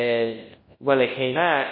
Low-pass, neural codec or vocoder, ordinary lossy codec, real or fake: 5.4 kHz; codec, 24 kHz, 0.9 kbps, WavTokenizer, large speech release; MP3, 24 kbps; fake